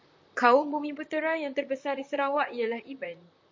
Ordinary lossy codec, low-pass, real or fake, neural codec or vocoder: MP3, 48 kbps; 7.2 kHz; fake; vocoder, 44.1 kHz, 128 mel bands, Pupu-Vocoder